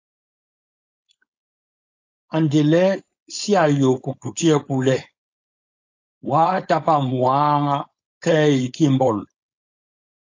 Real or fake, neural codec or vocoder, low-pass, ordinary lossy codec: fake; codec, 16 kHz, 4.8 kbps, FACodec; 7.2 kHz; AAC, 48 kbps